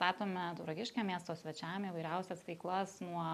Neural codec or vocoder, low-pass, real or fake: none; 14.4 kHz; real